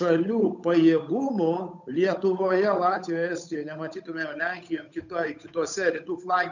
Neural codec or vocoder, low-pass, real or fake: codec, 16 kHz, 8 kbps, FunCodec, trained on Chinese and English, 25 frames a second; 7.2 kHz; fake